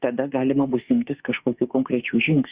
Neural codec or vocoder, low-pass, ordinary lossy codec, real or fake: autoencoder, 48 kHz, 32 numbers a frame, DAC-VAE, trained on Japanese speech; 3.6 kHz; Opus, 64 kbps; fake